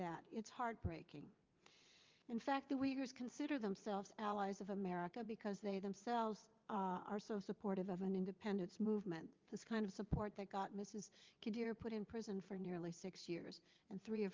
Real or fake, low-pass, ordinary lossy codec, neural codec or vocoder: fake; 7.2 kHz; Opus, 24 kbps; vocoder, 22.05 kHz, 80 mel bands, WaveNeXt